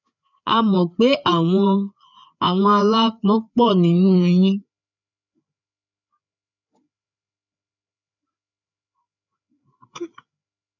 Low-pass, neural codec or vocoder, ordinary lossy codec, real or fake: 7.2 kHz; codec, 16 kHz, 4 kbps, FreqCodec, larger model; none; fake